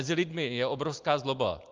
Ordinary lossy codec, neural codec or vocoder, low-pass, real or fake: Opus, 24 kbps; none; 7.2 kHz; real